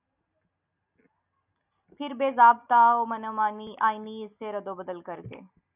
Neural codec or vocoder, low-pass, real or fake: none; 3.6 kHz; real